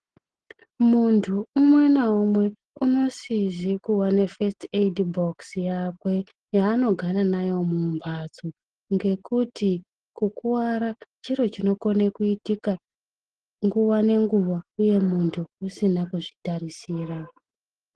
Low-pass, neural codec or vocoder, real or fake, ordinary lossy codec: 9.9 kHz; none; real; Opus, 16 kbps